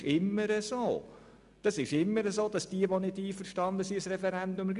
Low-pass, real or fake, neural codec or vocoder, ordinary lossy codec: 10.8 kHz; real; none; none